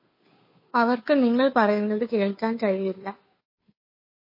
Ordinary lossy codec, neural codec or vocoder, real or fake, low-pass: MP3, 24 kbps; codec, 16 kHz, 2 kbps, FunCodec, trained on Chinese and English, 25 frames a second; fake; 5.4 kHz